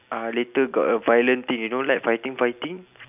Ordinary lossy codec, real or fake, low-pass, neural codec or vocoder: none; real; 3.6 kHz; none